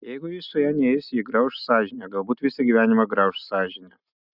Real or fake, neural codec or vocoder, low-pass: real; none; 5.4 kHz